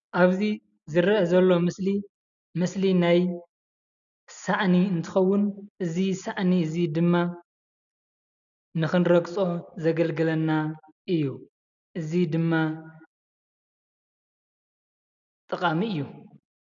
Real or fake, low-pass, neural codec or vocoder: real; 7.2 kHz; none